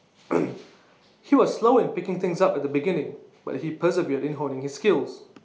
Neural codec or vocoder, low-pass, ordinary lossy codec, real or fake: none; none; none; real